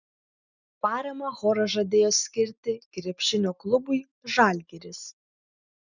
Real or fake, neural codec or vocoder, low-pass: real; none; 7.2 kHz